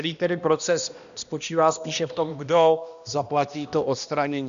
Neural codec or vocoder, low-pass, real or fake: codec, 16 kHz, 1 kbps, X-Codec, HuBERT features, trained on balanced general audio; 7.2 kHz; fake